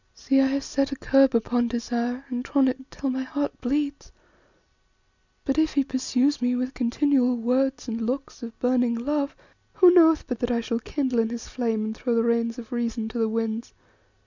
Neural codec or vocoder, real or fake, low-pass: none; real; 7.2 kHz